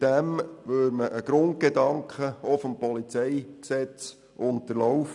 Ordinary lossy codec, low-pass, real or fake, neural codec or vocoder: none; 10.8 kHz; real; none